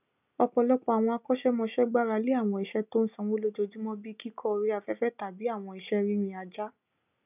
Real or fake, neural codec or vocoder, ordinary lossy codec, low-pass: real; none; none; 3.6 kHz